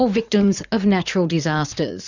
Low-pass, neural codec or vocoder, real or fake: 7.2 kHz; vocoder, 44.1 kHz, 80 mel bands, Vocos; fake